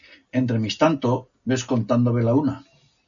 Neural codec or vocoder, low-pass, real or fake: none; 7.2 kHz; real